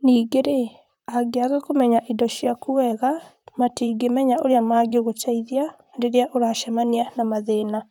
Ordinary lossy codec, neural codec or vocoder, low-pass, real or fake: none; vocoder, 44.1 kHz, 128 mel bands, Pupu-Vocoder; 19.8 kHz; fake